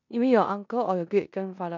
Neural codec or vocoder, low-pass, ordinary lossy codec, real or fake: codec, 16 kHz in and 24 kHz out, 0.9 kbps, LongCat-Audio-Codec, four codebook decoder; 7.2 kHz; none; fake